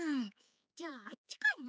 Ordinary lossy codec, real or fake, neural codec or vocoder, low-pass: none; fake; codec, 16 kHz, 4 kbps, X-Codec, HuBERT features, trained on general audio; none